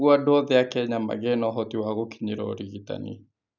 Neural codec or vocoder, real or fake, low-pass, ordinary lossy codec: none; real; 7.2 kHz; none